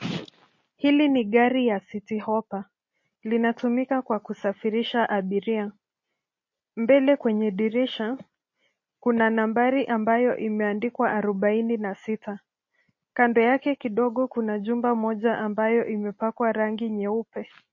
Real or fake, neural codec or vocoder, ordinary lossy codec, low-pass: real; none; MP3, 32 kbps; 7.2 kHz